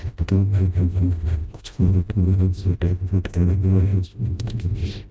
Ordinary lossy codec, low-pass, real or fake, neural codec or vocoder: none; none; fake; codec, 16 kHz, 0.5 kbps, FreqCodec, smaller model